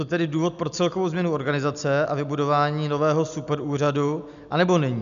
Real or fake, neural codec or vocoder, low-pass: real; none; 7.2 kHz